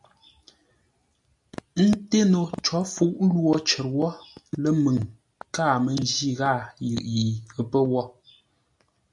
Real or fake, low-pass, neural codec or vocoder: real; 10.8 kHz; none